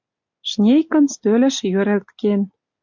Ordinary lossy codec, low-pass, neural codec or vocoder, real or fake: MP3, 64 kbps; 7.2 kHz; none; real